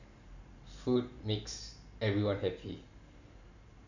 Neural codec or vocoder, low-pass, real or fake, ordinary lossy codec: none; 7.2 kHz; real; none